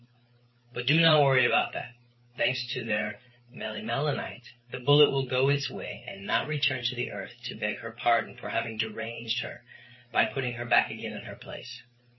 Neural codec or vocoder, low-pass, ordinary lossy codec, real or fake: codec, 16 kHz, 8 kbps, FreqCodec, larger model; 7.2 kHz; MP3, 24 kbps; fake